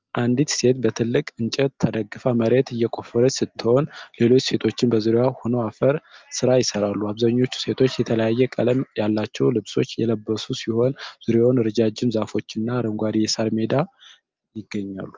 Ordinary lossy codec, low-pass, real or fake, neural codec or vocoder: Opus, 32 kbps; 7.2 kHz; real; none